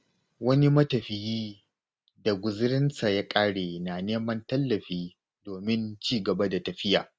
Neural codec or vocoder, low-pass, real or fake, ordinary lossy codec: none; none; real; none